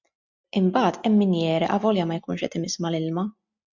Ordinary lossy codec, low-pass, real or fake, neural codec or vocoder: MP3, 64 kbps; 7.2 kHz; real; none